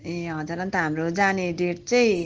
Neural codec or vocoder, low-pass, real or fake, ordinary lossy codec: none; 7.2 kHz; real; Opus, 16 kbps